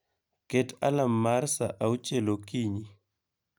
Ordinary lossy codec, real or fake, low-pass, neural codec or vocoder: none; real; none; none